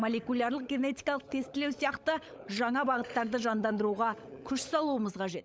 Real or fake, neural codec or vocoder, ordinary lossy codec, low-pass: fake; codec, 16 kHz, 16 kbps, FunCodec, trained on LibriTTS, 50 frames a second; none; none